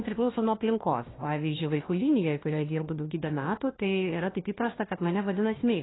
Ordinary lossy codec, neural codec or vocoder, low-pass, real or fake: AAC, 16 kbps; codec, 16 kHz, 1 kbps, FunCodec, trained on Chinese and English, 50 frames a second; 7.2 kHz; fake